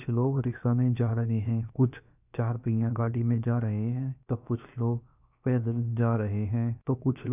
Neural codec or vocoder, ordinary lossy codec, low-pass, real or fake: codec, 16 kHz, about 1 kbps, DyCAST, with the encoder's durations; none; 3.6 kHz; fake